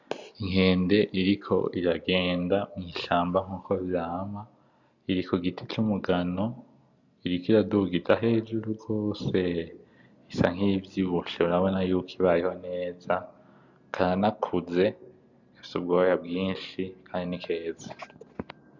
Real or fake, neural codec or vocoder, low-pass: real; none; 7.2 kHz